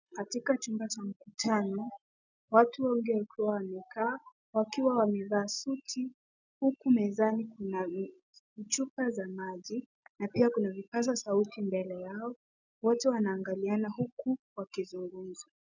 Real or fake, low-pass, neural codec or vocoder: real; 7.2 kHz; none